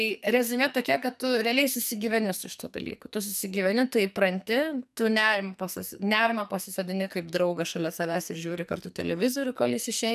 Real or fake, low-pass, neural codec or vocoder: fake; 14.4 kHz; codec, 44.1 kHz, 2.6 kbps, SNAC